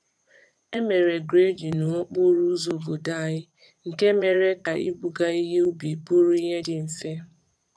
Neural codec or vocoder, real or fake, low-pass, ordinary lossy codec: vocoder, 22.05 kHz, 80 mel bands, Vocos; fake; none; none